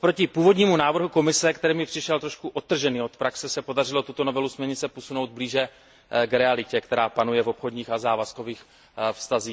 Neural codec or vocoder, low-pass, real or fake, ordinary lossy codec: none; none; real; none